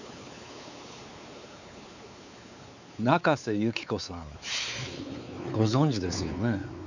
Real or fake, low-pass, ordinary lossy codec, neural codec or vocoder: fake; 7.2 kHz; none; codec, 16 kHz, 4 kbps, X-Codec, WavLM features, trained on Multilingual LibriSpeech